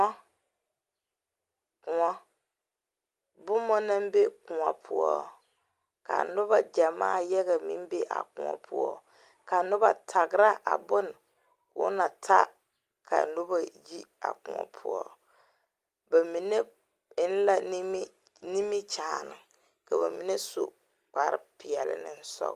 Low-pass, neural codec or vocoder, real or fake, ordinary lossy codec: 14.4 kHz; none; real; Opus, 32 kbps